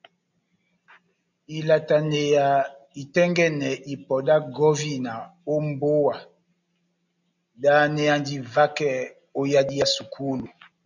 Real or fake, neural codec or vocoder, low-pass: real; none; 7.2 kHz